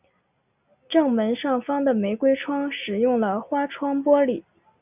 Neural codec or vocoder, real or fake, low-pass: none; real; 3.6 kHz